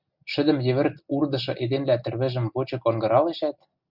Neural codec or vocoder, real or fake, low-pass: none; real; 5.4 kHz